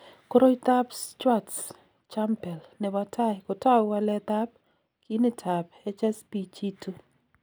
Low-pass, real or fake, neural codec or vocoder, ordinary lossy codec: none; real; none; none